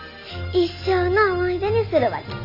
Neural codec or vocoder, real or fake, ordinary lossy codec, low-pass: none; real; MP3, 32 kbps; 5.4 kHz